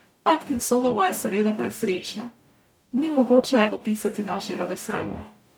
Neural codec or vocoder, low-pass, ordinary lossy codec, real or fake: codec, 44.1 kHz, 0.9 kbps, DAC; none; none; fake